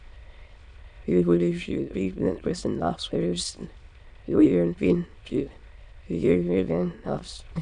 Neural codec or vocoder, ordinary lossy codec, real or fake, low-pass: autoencoder, 22.05 kHz, a latent of 192 numbers a frame, VITS, trained on many speakers; none; fake; 9.9 kHz